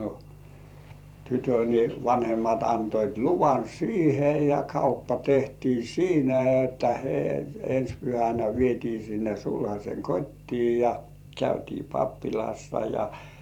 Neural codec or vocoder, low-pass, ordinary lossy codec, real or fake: vocoder, 44.1 kHz, 128 mel bands every 512 samples, BigVGAN v2; 19.8 kHz; none; fake